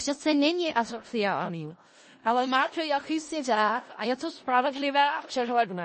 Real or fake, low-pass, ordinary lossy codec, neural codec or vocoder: fake; 10.8 kHz; MP3, 32 kbps; codec, 16 kHz in and 24 kHz out, 0.4 kbps, LongCat-Audio-Codec, four codebook decoder